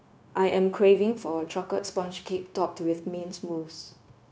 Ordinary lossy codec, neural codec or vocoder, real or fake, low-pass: none; codec, 16 kHz, 0.9 kbps, LongCat-Audio-Codec; fake; none